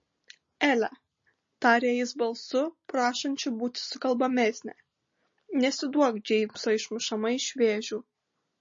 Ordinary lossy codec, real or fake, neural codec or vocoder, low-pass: MP3, 32 kbps; real; none; 7.2 kHz